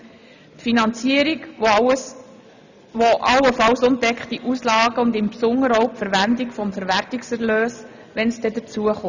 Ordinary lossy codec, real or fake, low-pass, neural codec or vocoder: none; real; 7.2 kHz; none